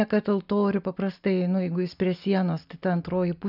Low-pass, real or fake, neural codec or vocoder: 5.4 kHz; real; none